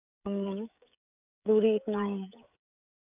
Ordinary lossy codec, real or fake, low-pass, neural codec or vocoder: none; fake; 3.6 kHz; codec, 16 kHz, 16 kbps, FreqCodec, smaller model